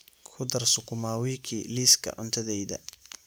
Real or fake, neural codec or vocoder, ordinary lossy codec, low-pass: real; none; none; none